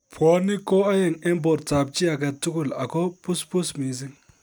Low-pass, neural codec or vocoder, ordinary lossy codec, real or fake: none; none; none; real